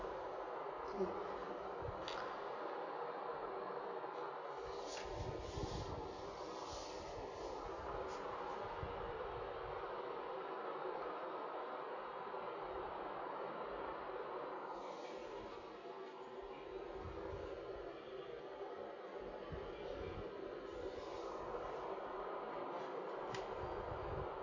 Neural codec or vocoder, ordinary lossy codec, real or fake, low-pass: none; none; real; 7.2 kHz